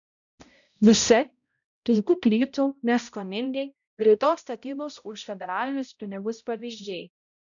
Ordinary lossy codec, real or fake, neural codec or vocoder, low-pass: MP3, 96 kbps; fake; codec, 16 kHz, 0.5 kbps, X-Codec, HuBERT features, trained on balanced general audio; 7.2 kHz